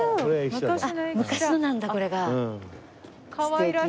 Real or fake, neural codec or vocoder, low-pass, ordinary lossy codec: real; none; none; none